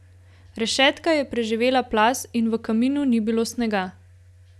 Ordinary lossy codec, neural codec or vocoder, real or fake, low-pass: none; none; real; none